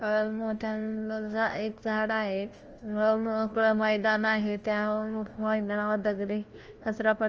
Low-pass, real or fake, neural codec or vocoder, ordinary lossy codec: 7.2 kHz; fake; codec, 16 kHz, 1 kbps, FunCodec, trained on LibriTTS, 50 frames a second; Opus, 24 kbps